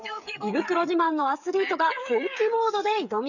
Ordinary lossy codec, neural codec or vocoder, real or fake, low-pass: none; codec, 16 kHz, 8 kbps, FreqCodec, smaller model; fake; 7.2 kHz